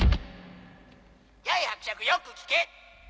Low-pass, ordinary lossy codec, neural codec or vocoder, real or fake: none; none; none; real